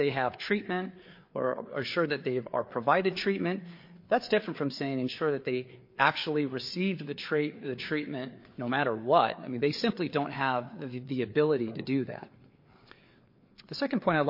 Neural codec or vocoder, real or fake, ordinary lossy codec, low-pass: codec, 16 kHz, 4 kbps, FreqCodec, larger model; fake; MP3, 32 kbps; 5.4 kHz